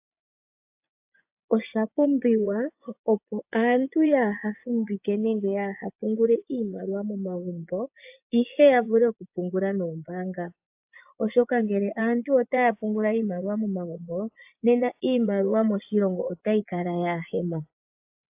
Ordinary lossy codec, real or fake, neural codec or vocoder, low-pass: AAC, 32 kbps; fake; vocoder, 24 kHz, 100 mel bands, Vocos; 3.6 kHz